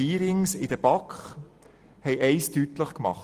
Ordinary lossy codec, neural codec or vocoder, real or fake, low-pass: Opus, 24 kbps; none; real; 14.4 kHz